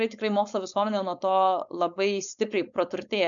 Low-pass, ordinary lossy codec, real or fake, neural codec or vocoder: 7.2 kHz; MP3, 96 kbps; fake; codec, 16 kHz, 4.8 kbps, FACodec